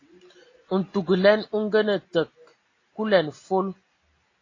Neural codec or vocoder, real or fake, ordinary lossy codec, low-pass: none; real; AAC, 32 kbps; 7.2 kHz